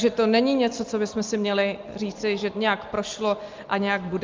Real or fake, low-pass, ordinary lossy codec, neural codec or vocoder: real; 7.2 kHz; Opus, 32 kbps; none